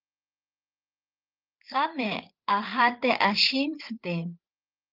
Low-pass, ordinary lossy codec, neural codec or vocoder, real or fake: 5.4 kHz; Opus, 32 kbps; codec, 16 kHz, 8 kbps, FreqCodec, larger model; fake